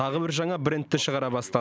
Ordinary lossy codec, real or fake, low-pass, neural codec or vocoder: none; real; none; none